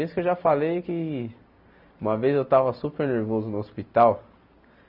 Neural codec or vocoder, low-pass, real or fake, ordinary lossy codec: none; 5.4 kHz; real; none